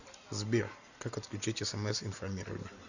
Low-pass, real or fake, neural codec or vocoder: 7.2 kHz; real; none